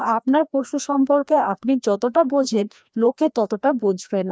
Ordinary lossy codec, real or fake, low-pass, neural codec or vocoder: none; fake; none; codec, 16 kHz, 1 kbps, FreqCodec, larger model